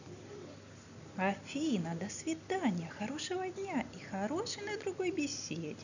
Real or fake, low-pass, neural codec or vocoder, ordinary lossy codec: real; 7.2 kHz; none; none